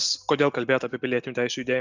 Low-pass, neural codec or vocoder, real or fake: 7.2 kHz; none; real